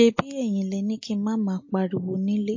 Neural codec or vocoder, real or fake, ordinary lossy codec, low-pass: none; real; MP3, 32 kbps; 7.2 kHz